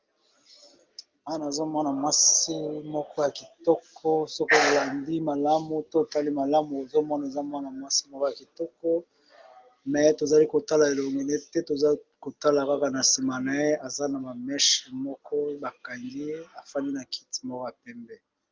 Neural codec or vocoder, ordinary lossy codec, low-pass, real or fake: none; Opus, 24 kbps; 7.2 kHz; real